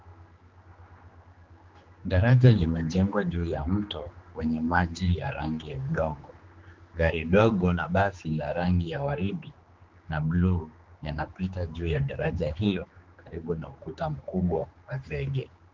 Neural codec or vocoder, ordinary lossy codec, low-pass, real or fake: codec, 16 kHz, 2 kbps, X-Codec, HuBERT features, trained on general audio; Opus, 32 kbps; 7.2 kHz; fake